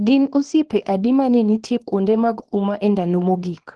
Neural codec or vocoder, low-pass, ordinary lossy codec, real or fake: codec, 24 kHz, 0.9 kbps, WavTokenizer, small release; 10.8 kHz; Opus, 16 kbps; fake